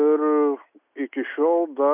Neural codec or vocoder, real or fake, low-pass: none; real; 3.6 kHz